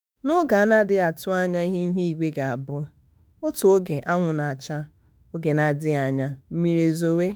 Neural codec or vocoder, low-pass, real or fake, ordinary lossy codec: autoencoder, 48 kHz, 32 numbers a frame, DAC-VAE, trained on Japanese speech; none; fake; none